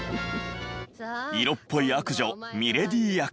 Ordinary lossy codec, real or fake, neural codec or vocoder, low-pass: none; real; none; none